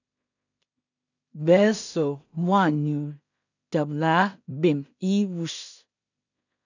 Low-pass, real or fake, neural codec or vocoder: 7.2 kHz; fake; codec, 16 kHz in and 24 kHz out, 0.4 kbps, LongCat-Audio-Codec, two codebook decoder